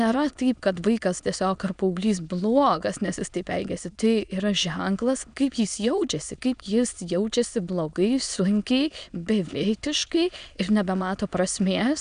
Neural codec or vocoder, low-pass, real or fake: autoencoder, 22.05 kHz, a latent of 192 numbers a frame, VITS, trained on many speakers; 9.9 kHz; fake